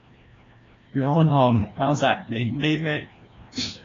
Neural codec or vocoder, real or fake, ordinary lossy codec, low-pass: codec, 16 kHz, 1 kbps, FreqCodec, larger model; fake; AAC, 32 kbps; 7.2 kHz